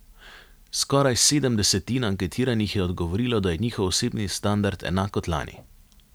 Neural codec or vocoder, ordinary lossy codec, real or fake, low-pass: none; none; real; none